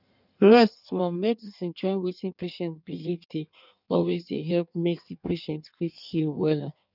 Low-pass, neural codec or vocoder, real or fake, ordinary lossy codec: 5.4 kHz; codec, 16 kHz in and 24 kHz out, 1.1 kbps, FireRedTTS-2 codec; fake; none